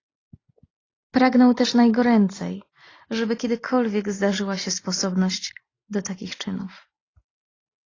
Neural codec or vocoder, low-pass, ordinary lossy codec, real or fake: none; 7.2 kHz; AAC, 32 kbps; real